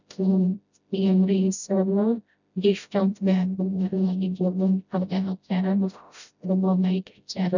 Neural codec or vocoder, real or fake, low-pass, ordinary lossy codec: codec, 16 kHz, 0.5 kbps, FreqCodec, smaller model; fake; 7.2 kHz; none